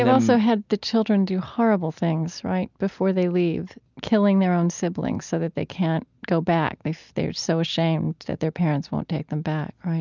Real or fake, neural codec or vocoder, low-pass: real; none; 7.2 kHz